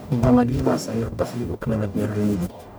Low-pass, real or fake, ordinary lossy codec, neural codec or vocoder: none; fake; none; codec, 44.1 kHz, 0.9 kbps, DAC